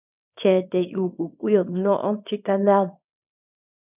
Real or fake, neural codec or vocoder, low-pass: fake; codec, 24 kHz, 0.9 kbps, WavTokenizer, small release; 3.6 kHz